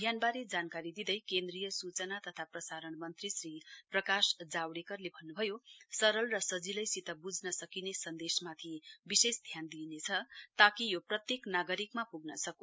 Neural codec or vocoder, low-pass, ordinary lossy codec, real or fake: none; none; none; real